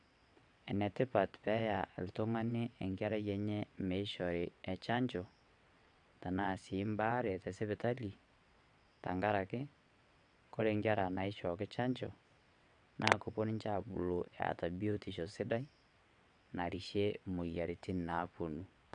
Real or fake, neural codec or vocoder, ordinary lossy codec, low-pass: fake; vocoder, 22.05 kHz, 80 mel bands, WaveNeXt; none; 9.9 kHz